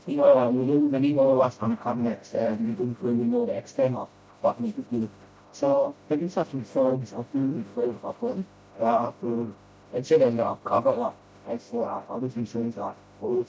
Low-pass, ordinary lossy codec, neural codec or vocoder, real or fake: none; none; codec, 16 kHz, 0.5 kbps, FreqCodec, smaller model; fake